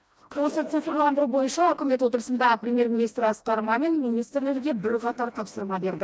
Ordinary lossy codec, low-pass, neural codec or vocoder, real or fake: none; none; codec, 16 kHz, 1 kbps, FreqCodec, smaller model; fake